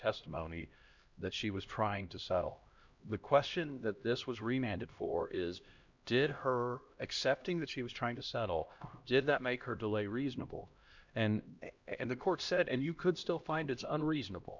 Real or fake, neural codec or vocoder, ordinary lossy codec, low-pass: fake; codec, 16 kHz, 1 kbps, X-Codec, HuBERT features, trained on LibriSpeech; Opus, 64 kbps; 7.2 kHz